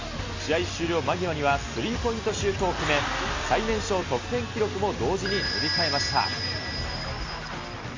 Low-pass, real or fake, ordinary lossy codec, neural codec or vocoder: 7.2 kHz; real; MP3, 32 kbps; none